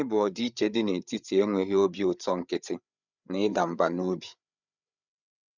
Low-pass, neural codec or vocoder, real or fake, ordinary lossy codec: 7.2 kHz; codec, 16 kHz, 8 kbps, FreqCodec, larger model; fake; none